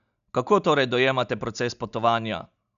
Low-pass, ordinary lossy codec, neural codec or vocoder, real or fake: 7.2 kHz; none; none; real